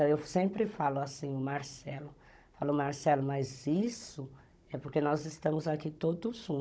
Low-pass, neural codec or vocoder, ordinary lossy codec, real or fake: none; codec, 16 kHz, 16 kbps, FunCodec, trained on Chinese and English, 50 frames a second; none; fake